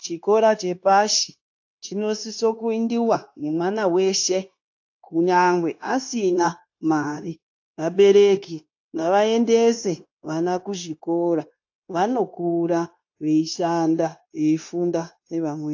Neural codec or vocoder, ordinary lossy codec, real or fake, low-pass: codec, 16 kHz, 0.9 kbps, LongCat-Audio-Codec; AAC, 48 kbps; fake; 7.2 kHz